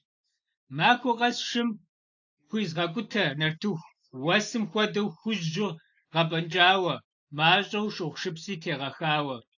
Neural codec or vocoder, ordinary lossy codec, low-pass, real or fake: none; AAC, 48 kbps; 7.2 kHz; real